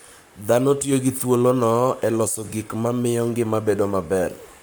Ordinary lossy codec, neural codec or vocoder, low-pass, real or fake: none; codec, 44.1 kHz, 7.8 kbps, Pupu-Codec; none; fake